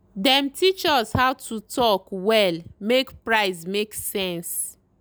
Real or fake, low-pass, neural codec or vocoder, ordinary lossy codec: real; none; none; none